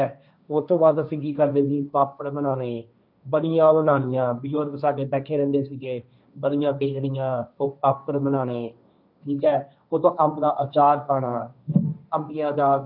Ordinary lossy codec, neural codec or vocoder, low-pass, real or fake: none; codec, 16 kHz, 1.1 kbps, Voila-Tokenizer; 5.4 kHz; fake